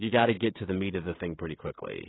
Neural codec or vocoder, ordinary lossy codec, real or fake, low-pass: codec, 16 kHz, 16 kbps, FreqCodec, smaller model; AAC, 16 kbps; fake; 7.2 kHz